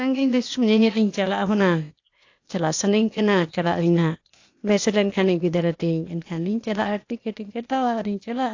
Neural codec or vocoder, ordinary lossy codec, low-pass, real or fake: codec, 16 kHz, 0.8 kbps, ZipCodec; AAC, 48 kbps; 7.2 kHz; fake